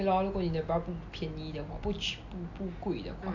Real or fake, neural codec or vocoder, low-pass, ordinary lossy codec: real; none; 7.2 kHz; none